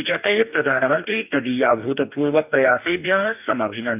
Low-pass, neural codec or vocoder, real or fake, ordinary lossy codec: 3.6 kHz; codec, 44.1 kHz, 2.6 kbps, DAC; fake; none